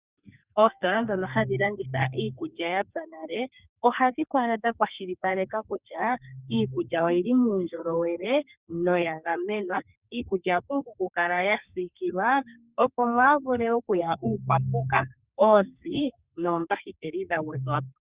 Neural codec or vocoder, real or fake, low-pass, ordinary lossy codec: codec, 32 kHz, 1.9 kbps, SNAC; fake; 3.6 kHz; Opus, 64 kbps